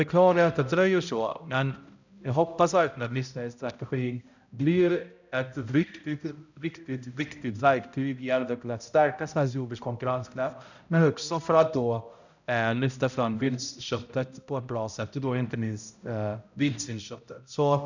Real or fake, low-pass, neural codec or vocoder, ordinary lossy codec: fake; 7.2 kHz; codec, 16 kHz, 0.5 kbps, X-Codec, HuBERT features, trained on balanced general audio; none